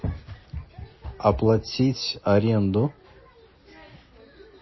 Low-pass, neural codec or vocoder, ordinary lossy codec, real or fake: 7.2 kHz; none; MP3, 24 kbps; real